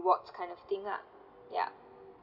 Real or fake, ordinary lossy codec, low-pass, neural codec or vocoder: real; none; 5.4 kHz; none